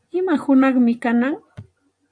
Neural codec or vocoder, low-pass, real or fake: vocoder, 24 kHz, 100 mel bands, Vocos; 9.9 kHz; fake